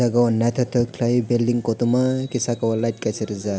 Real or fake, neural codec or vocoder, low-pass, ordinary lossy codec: real; none; none; none